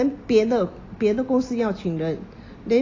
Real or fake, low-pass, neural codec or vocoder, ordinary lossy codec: real; 7.2 kHz; none; AAC, 32 kbps